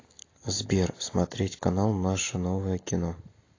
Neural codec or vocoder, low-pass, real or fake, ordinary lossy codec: none; 7.2 kHz; real; AAC, 32 kbps